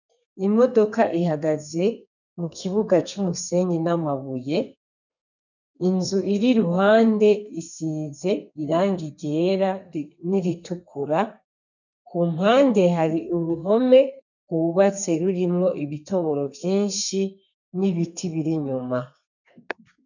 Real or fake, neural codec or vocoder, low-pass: fake; codec, 32 kHz, 1.9 kbps, SNAC; 7.2 kHz